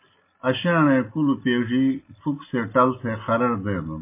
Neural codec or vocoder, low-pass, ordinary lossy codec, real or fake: none; 3.6 kHz; MP3, 24 kbps; real